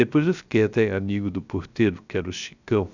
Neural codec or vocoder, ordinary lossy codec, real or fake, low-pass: codec, 16 kHz, 0.3 kbps, FocalCodec; none; fake; 7.2 kHz